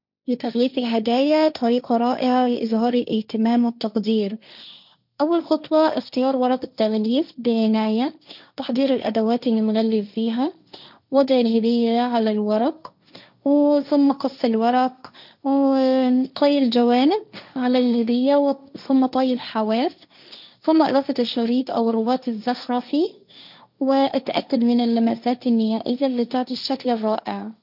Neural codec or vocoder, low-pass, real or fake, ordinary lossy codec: codec, 16 kHz, 1.1 kbps, Voila-Tokenizer; 5.4 kHz; fake; none